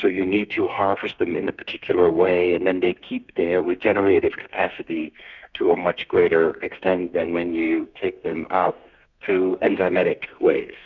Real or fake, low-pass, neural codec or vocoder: fake; 7.2 kHz; codec, 32 kHz, 1.9 kbps, SNAC